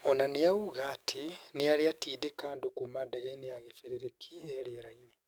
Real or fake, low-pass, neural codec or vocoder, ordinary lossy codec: fake; none; codec, 44.1 kHz, 7.8 kbps, DAC; none